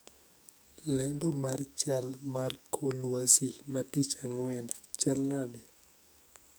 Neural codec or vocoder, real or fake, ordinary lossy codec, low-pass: codec, 44.1 kHz, 2.6 kbps, SNAC; fake; none; none